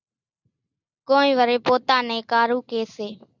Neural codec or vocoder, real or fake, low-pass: none; real; 7.2 kHz